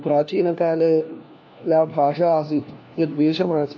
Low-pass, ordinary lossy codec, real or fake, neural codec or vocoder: none; none; fake; codec, 16 kHz, 1 kbps, FunCodec, trained on LibriTTS, 50 frames a second